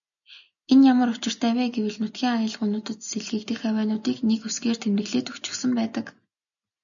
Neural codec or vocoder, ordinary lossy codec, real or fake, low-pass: none; MP3, 96 kbps; real; 7.2 kHz